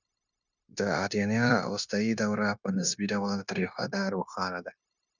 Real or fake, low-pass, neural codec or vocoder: fake; 7.2 kHz; codec, 16 kHz, 0.9 kbps, LongCat-Audio-Codec